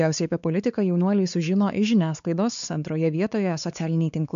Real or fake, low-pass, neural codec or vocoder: fake; 7.2 kHz; codec, 16 kHz, 4 kbps, X-Codec, WavLM features, trained on Multilingual LibriSpeech